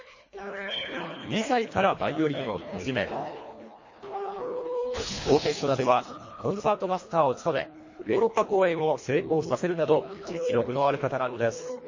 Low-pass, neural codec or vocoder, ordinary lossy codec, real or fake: 7.2 kHz; codec, 24 kHz, 1.5 kbps, HILCodec; MP3, 32 kbps; fake